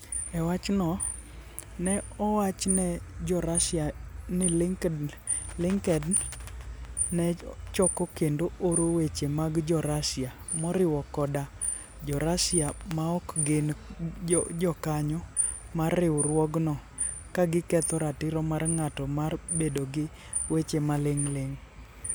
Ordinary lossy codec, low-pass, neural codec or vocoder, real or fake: none; none; none; real